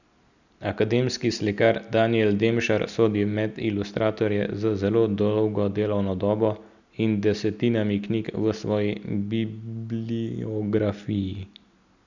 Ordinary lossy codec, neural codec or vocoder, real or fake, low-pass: none; none; real; 7.2 kHz